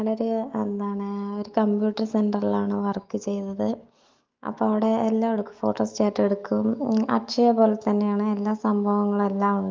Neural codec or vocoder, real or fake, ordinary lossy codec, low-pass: autoencoder, 48 kHz, 128 numbers a frame, DAC-VAE, trained on Japanese speech; fake; Opus, 32 kbps; 7.2 kHz